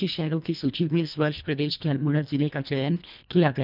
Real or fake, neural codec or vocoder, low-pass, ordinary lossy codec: fake; codec, 24 kHz, 1.5 kbps, HILCodec; 5.4 kHz; none